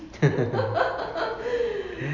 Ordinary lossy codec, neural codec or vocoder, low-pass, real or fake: none; none; 7.2 kHz; real